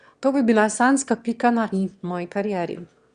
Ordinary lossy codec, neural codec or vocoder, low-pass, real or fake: Opus, 64 kbps; autoencoder, 22.05 kHz, a latent of 192 numbers a frame, VITS, trained on one speaker; 9.9 kHz; fake